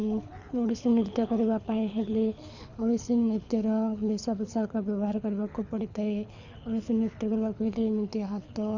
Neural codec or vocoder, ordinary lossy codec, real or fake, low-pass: codec, 24 kHz, 6 kbps, HILCodec; none; fake; 7.2 kHz